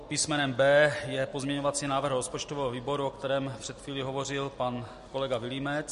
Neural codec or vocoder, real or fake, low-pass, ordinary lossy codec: none; real; 14.4 kHz; MP3, 48 kbps